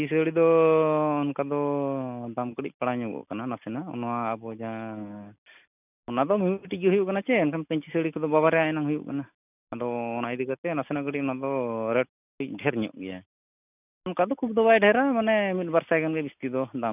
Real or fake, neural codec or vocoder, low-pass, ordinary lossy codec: real; none; 3.6 kHz; none